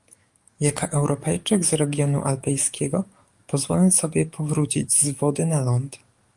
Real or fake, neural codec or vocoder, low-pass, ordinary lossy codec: fake; codec, 44.1 kHz, 7.8 kbps, DAC; 10.8 kHz; Opus, 32 kbps